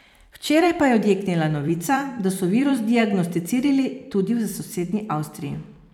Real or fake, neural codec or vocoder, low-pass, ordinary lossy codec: fake; vocoder, 44.1 kHz, 128 mel bands every 256 samples, BigVGAN v2; 19.8 kHz; none